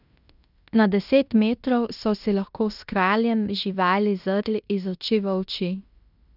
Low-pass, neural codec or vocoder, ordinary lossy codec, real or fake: 5.4 kHz; codec, 16 kHz in and 24 kHz out, 0.9 kbps, LongCat-Audio-Codec, fine tuned four codebook decoder; none; fake